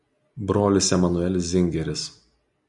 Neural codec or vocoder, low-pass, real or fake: none; 10.8 kHz; real